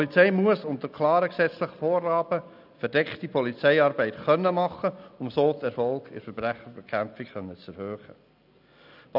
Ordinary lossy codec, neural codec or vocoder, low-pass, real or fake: none; none; 5.4 kHz; real